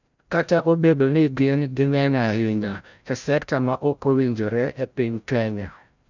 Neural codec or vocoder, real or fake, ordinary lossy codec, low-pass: codec, 16 kHz, 0.5 kbps, FreqCodec, larger model; fake; none; 7.2 kHz